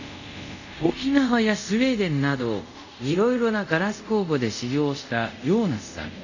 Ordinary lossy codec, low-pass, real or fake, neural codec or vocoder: none; 7.2 kHz; fake; codec, 24 kHz, 0.5 kbps, DualCodec